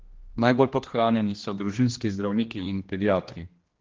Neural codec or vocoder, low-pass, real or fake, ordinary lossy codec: codec, 16 kHz, 1 kbps, X-Codec, HuBERT features, trained on general audio; 7.2 kHz; fake; Opus, 16 kbps